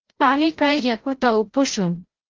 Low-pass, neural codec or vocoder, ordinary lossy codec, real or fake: 7.2 kHz; codec, 16 kHz, 0.5 kbps, FreqCodec, larger model; Opus, 16 kbps; fake